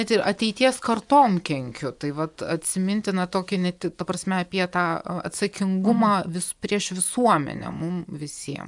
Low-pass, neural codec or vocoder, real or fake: 10.8 kHz; vocoder, 24 kHz, 100 mel bands, Vocos; fake